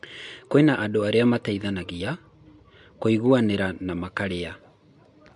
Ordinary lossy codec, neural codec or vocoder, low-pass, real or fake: MP3, 64 kbps; none; 10.8 kHz; real